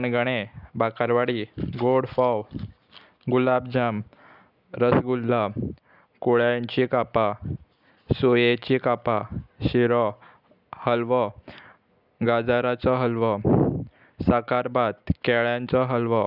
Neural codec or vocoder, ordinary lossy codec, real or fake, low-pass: none; none; real; 5.4 kHz